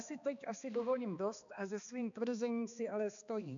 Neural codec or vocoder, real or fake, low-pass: codec, 16 kHz, 2 kbps, X-Codec, HuBERT features, trained on balanced general audio; fake; 7.2 kHz